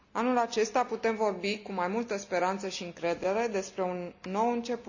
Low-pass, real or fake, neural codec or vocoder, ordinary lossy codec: 7.2 kHz; real; none; none